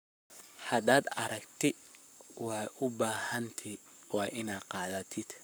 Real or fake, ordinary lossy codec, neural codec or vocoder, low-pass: fake; none; codec, 44.1 kHz, 7.8 kbps, Pupu-Codec; none